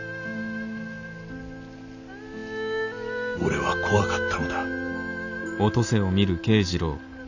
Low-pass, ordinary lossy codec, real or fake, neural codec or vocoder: 7.2 kHz; none; real; none